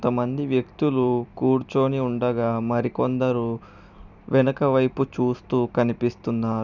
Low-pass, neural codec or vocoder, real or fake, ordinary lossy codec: 7.2 kHz; vocoder, 44.1 kHz, 128 mel bands every 256 samples, BigVGAN v2; fake; none